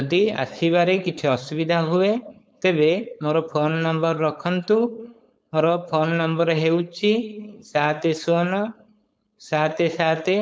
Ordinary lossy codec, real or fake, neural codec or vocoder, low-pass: none; fake; codec, 16 kHz, 4.8 kbps, FACodec; none